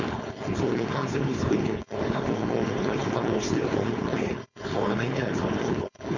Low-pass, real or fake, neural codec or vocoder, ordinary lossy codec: 7.2 kHz; fake; codec, 16 kHz, 4.8 kbps, FACodec; none